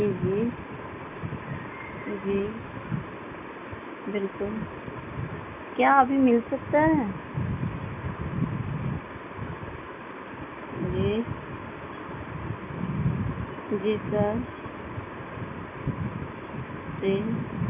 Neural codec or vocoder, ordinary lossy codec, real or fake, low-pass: none; none; real; 3.6 kHz